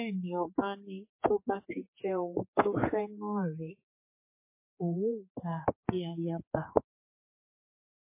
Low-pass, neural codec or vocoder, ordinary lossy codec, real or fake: 3.6 kHz; codec, 16 kHz, 4 kbps, X-Codec, HuBERT features, trained on general audio; MP3, 24 kbps; fake